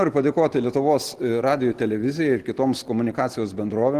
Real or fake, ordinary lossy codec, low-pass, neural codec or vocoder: real; Opus, 16 kbps; 14.4 kHz; none